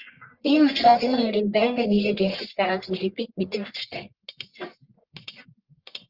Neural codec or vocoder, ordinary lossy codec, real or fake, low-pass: codec, 44.1 kHz, 1.7 kbps, Pupu-Codec; Opus, 24 kbps; fake; 5.4 kHz